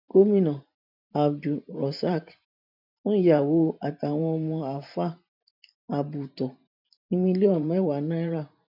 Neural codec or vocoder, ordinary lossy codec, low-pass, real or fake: vocoder, 44.1 kHz, 128 mel bands every 512 samples, BigVGAN v2; none; 5.4 kHz; fake